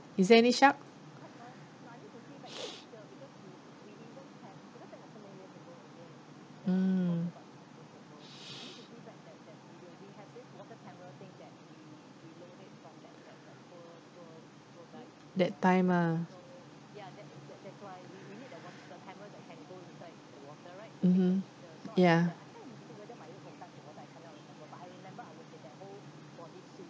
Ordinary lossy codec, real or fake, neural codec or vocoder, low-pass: none; real; none; none